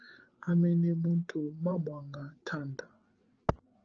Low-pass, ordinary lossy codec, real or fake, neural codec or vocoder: 7.2 kHz; Opus, 16 kbps; fake; codec, 16 kHz, 8 kbps, FreqCodec, larger model